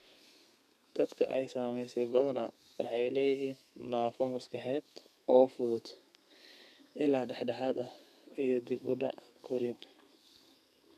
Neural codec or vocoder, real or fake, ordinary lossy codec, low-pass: codec, 32 kHz, 1.9 kbps, SNAC; fake; none; 14.4 kHz